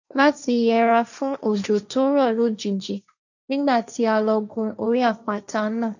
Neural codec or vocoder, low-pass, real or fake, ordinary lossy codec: codec, 16 kHz, 1.1 kbps, Voila-Tokenizer; 7.2 kHz; fake; none